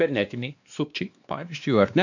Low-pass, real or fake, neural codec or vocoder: 7.2 kHz; fake; codec, 16 kHz, 2 kbps, X-Codec, WavLM features, trained on Multilingual LibriSpeech